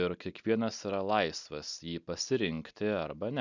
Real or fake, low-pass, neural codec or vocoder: real; 7.2 kHz; none